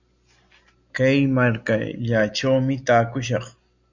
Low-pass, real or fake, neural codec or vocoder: 7.2 kHz; real; none